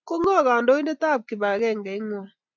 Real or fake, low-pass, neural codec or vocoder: real; 7.2 kHz; none